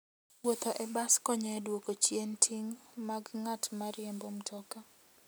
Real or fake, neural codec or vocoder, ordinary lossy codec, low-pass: real; none; none; none